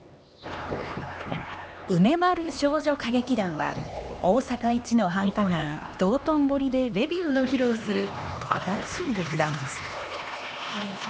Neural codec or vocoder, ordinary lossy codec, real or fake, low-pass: codec, 16 kHz, 2 kbps, X-Codec, HuBERT features, trained on LibriSpeech; none; fake; none